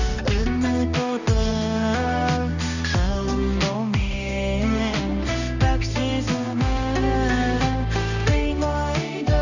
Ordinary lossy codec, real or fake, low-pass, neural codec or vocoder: none; fake; 7.2 kHz; codec, 16 kHz, 1 kbps, X-Codec, HuBERT features, trained on balanced general audio